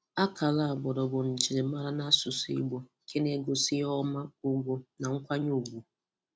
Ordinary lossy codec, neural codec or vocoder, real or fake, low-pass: none; none; real; none